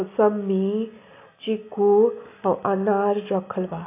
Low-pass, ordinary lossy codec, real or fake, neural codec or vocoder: 3.6 kHz; AAC, 24 kbps; real; none